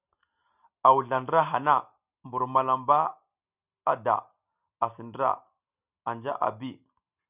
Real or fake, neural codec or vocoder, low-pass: real; none; 3.6 kHz